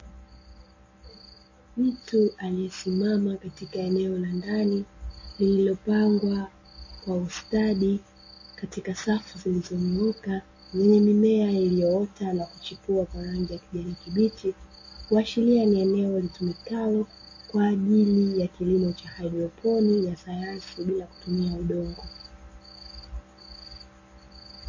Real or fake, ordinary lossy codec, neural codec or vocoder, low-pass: real; MP3, 32 kbps; none; 7.2 kHz